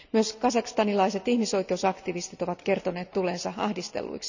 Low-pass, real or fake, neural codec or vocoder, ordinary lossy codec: 7.2 kHz; real; none; none